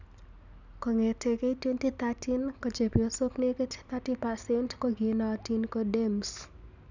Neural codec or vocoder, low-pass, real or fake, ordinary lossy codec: none; 7.2 kHz; real; none